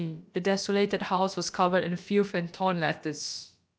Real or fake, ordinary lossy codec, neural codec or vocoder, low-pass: fake; none; codec, 16 kHz, about 1 kbps, DyCAST, with the encoder's durations; none